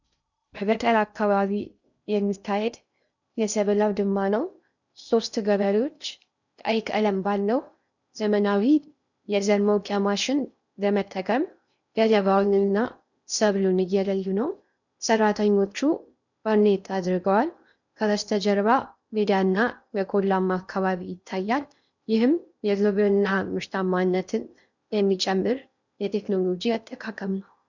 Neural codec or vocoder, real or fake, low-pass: codec, 16 kHz in and 24 kHz out, 0.6 kbps, FocalCodec, streaming, 2048 codes; fake; 7.2 kHz